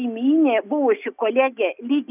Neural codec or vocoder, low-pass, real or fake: none; 3.6 kHz; real